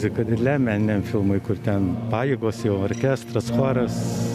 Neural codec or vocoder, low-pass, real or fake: none; 14.4 kHz; real